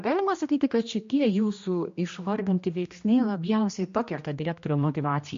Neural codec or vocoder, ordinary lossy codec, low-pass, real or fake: codec, 16 kHz, 1 kbps, X-Codec, HuBERT features, trained on general audio; MP3, 48 kbps; 7.2 kHz; fake